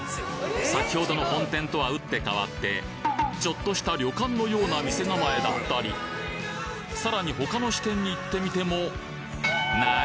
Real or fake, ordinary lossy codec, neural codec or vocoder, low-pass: real; none; none; none